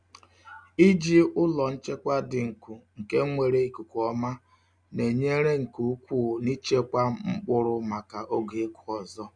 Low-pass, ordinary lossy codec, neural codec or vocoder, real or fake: 9.9 kHz; none; none; real